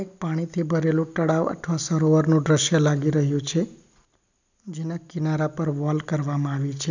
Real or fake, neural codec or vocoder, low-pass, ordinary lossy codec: real; none; 7.2 kHz; none